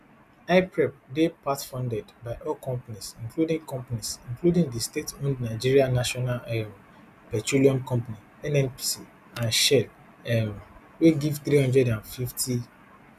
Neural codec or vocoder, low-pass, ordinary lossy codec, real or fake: none; 14.4 kHz; none; real